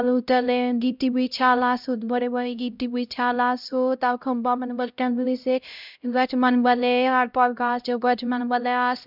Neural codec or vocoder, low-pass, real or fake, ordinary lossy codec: codec, 16 kHz, 0.5 kbps, X-Codec, HuBERT features, trained on LibriSpeech; 5.4 kHz; fake; none